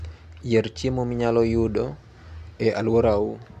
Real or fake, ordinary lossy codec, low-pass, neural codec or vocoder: real; MP3, 96 kbps; 14.4 kHz; none